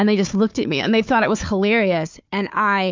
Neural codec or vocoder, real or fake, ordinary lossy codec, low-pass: codec, 16 kHz, 4 kbps, FunCodec, trained on Chinese and English, 50 frames a second; fake; MP3, 64 kbps; 7.2 kHz